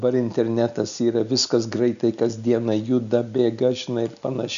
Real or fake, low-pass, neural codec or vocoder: real; 7.2 kHz; none